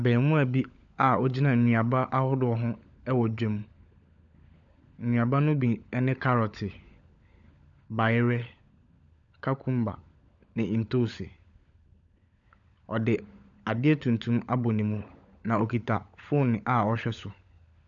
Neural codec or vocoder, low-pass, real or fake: codec, 16 kHz, 16 kbps, FunCodec, trained on LibriTTS, 50 frames a second; 7.2 kHz; fake